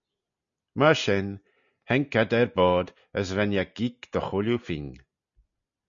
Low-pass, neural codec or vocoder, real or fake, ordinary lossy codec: 7.2 kHz; none; real; MP3, 96 kbps